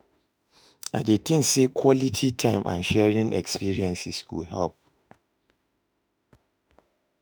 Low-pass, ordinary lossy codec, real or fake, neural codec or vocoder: none; none; fake; autoencoder, 48 kHz, 32 numbers a frame, DAC-VAE, trained on Japanese speech